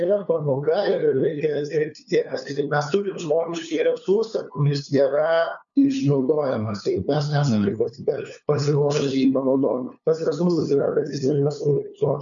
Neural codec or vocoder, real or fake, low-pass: codec, 16 kHz, 2 kbps, FunCodec, trained on LibriTTS, 25 frames a second; fake; 7.2 kHz